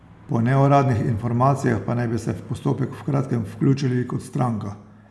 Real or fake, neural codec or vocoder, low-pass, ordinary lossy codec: real; none; none; none